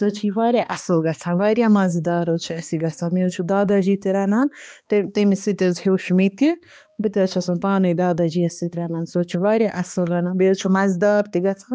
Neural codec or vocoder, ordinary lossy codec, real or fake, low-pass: codec, 16 kHz, 2 kbps, X-Codec, HuBERT features, trained on balanced general audio; none; fake; none